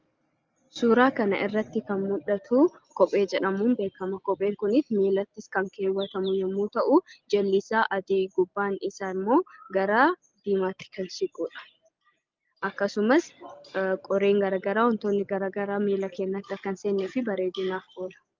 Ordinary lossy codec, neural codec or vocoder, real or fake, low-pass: Opus, 32 kbps; none; real; 7.2 kHz